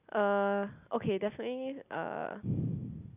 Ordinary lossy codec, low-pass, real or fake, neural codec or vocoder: none; 3.6 kHz; real; none